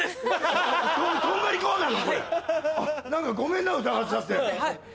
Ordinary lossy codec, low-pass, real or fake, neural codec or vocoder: none; none; real; none